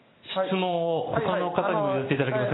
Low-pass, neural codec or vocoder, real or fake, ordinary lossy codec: 7.2 kHz; none; real; AAC, 16 kbps